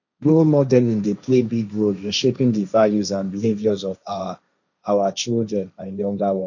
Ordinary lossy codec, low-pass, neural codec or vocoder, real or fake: none; 7.2 kHz; codec, 16 kHz, 1.1 kbps, Voila-Tokenizer; fake